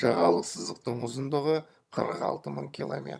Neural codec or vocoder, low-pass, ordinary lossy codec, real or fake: vocoder, 22.05 kHz, 80 mel bands, HiFi-GAN; none; none; fake